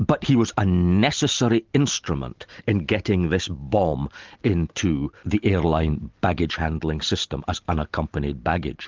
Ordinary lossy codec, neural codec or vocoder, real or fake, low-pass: Opus, 24 kbps; none; real; 7.2 kHz